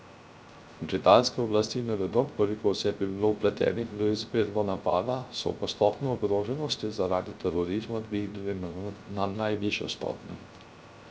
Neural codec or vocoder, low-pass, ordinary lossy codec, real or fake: codec, 16 kHz, 0.3 kbps, FocalCodec; none; none; fake